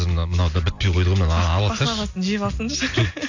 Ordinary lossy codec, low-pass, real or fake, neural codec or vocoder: AAC, 48 kbps; 7.2 kHz; real; none